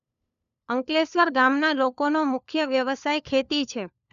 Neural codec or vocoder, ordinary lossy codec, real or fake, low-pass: codec, 16 kHz, 4 kbps, FunCodec, trained on LibriTTS, 50 frames a second; none; fake; 7.2 kHz